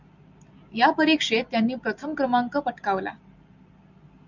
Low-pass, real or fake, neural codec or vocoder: 7.2 kHz; real; none